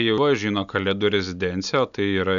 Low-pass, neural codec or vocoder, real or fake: 7.2 kHz; none; real